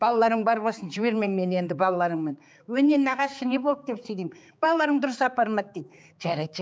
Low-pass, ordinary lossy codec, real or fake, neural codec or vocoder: none; none; fake; codec, 16 kHz, 4 kbps, X-Codec, HuBERT features, trained on balanced general audio